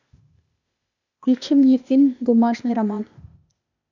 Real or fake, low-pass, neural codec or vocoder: fake; 7.2 kHz; codec, 16 kHz, 0.8 kbps, ZipCodec